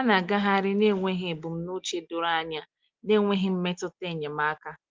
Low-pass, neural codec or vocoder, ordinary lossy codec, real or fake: 7.2 kHz; none; Opus, 32 kbps; real